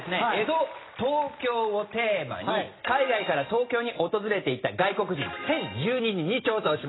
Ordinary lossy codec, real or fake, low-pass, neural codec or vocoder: AAC, 16 kbps; real; 7.2 kHz; none